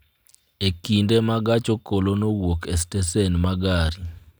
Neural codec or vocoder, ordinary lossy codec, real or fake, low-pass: none; none; real; none